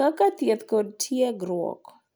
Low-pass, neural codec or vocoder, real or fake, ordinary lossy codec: none; none; real; none